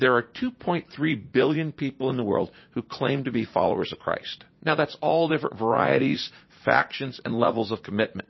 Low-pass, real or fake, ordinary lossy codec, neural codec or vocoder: 7.2 kHz; fake; MP3, 24 kbps; vocoder, 44.1 kHz, 80 mel bands, Vocos